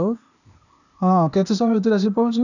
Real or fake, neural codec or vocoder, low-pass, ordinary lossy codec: fake; codec, 16 kHz, 0.8 kbps, ZipCodec; 7.2 kHz; none